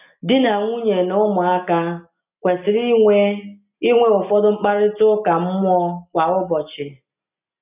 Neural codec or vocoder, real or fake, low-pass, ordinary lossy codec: none; real; 3.6 kHz; none